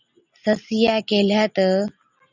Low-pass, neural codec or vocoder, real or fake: 7.2 kHz; none; real